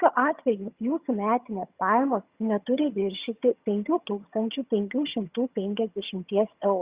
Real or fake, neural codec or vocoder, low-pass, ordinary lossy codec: fake; vocoder, 22.05 kHz, 80 mel bands, HiFi-GAN; 3.6 kHz; Opus, 64 kbps